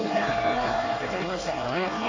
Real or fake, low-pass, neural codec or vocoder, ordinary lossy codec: fake; 7.2 kHz; codec, 24 kHz, 1 kbps, SNAC; AAC, 32 kbps